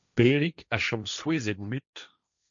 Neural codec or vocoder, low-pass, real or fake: codec, 16 kHz, 1.1 kbps, Voila-Tokenizer; 7.2 kHz; fake